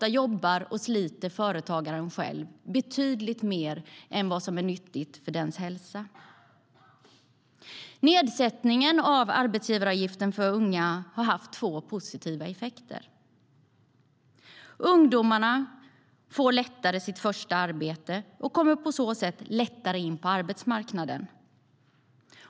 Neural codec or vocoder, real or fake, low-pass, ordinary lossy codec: none; real; none; none